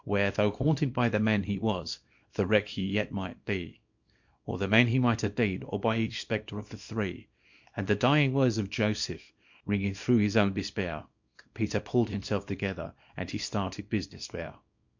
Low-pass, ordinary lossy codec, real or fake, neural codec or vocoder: 7.2 kHz; MP3, 48 kbps; fake; codec, 24 kHz, 0.9 kbps, WavTokenizer, small release